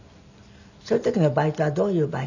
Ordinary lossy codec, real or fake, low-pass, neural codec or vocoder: none; real; 7.2 kHz; none